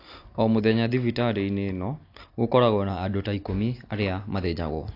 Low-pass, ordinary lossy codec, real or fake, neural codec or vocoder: 5.4 kHz; AAC, 32 kbps; real; none